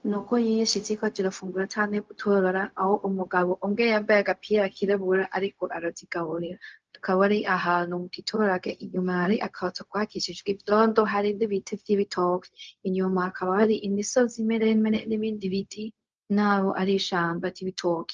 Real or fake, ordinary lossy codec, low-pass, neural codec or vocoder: fake; Opus, 24 kbps; 7.2 kHz; codec, 16 kHz, 0.4 kbps, LongCat-Audio-Codec